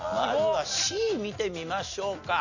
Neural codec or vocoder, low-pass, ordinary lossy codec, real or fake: none; 7.2 kHz; none; real